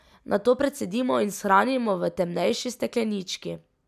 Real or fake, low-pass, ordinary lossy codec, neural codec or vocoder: real; 14.4 kHz; none; none